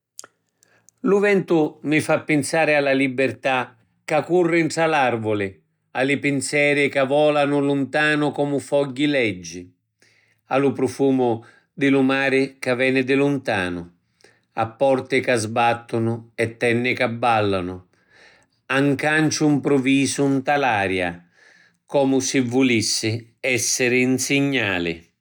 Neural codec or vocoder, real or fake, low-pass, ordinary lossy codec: none; real; 19.8 kHz; none